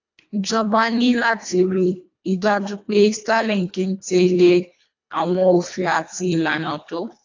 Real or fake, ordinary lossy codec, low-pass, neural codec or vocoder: fake; AAC, 48 kbps; 7.2 kHz; codec, 24 kHz, 1.5 kbps, HILCodec